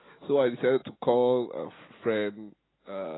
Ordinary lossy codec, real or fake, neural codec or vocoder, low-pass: AAC, 16 kbps; real; none; 7.2 kHz